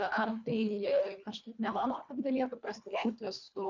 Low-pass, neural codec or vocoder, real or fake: 7.2 kHz; codec, 24 kHz, 1.5 kbps, HILCodec; fake